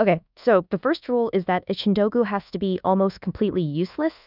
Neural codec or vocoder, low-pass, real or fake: codec, 24 kHz, 1.2 kbps, DualCodec; 5.4 kHz; fake